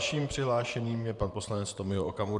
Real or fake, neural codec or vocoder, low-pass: fake; vocoder, 44.1 kHz, 128 mel bands, Pupu-Vocoder; 10.8 kHz